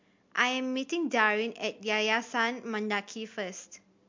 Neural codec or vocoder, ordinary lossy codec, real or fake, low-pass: none; MP3, 48 kbps; real; 7.2 kHz